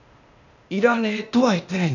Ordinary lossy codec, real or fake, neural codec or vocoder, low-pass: none; fake; codec, 16 kHz, 0.8 kbps, ZipCodec; 7.2 kHz